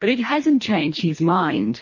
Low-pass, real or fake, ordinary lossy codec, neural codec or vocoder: 7.2 kHz; fake; MP3, 32 kbps; codec, 24 kHz, 1.5 kbps, HILCodec